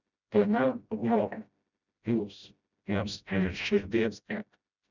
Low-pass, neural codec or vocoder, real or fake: 7.2 kHz; codec, 16 kHz, 0.5 kbps, FreqCodec, smaller model; fake